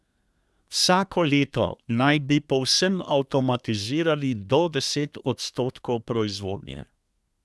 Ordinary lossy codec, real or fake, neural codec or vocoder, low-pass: none; fake; codec, 24 kHz, 1 kbps, SNAC; none